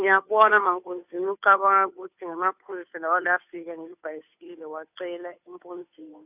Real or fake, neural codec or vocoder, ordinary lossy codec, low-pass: fake; codec, 16 kHz, 2 kbps, FunCodec, trained on Chinese and English, 25 frames a second; none; 3.6 kHz